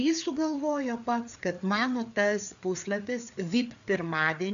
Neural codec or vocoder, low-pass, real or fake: codec, 16 kHz, 4 kbps, FreqCodec, larger model; 7.2 kHz; fake